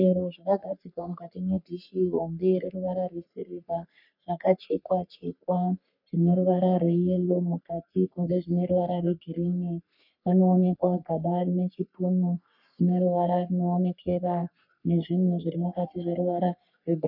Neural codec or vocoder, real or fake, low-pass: codec, 44.1 kHz, 3.4 kbps, Pupu-Codec; fake; 5.4 kHz